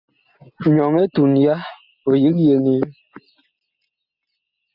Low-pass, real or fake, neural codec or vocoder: 5.4 kHz; real; none